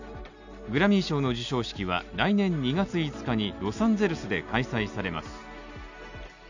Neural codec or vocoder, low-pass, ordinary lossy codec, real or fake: none; 7.2 kHz; none; real